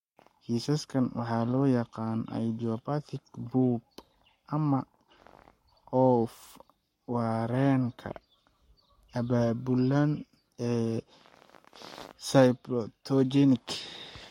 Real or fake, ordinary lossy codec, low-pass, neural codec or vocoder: fake; MP3, 64 kbps; 19.8 kHz; codec, 44.1 kHz, 7.8 kbps, Pupu-Codec